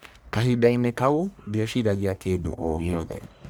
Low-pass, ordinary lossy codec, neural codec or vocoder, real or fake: none; none; codec, 44.1 kHz, 1.7 kbps, Pupu-Codec; fake